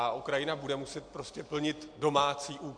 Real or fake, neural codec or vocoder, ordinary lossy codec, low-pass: real; none; MP3, 64 kbps; 9.9 kHz